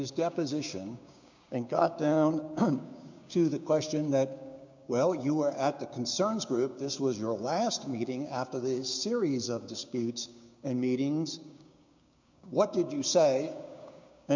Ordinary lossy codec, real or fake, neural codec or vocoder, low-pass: MP3, 64 kbps; fake; codec, 16 kHz, 6 kbps, DAC; 7.2 kHz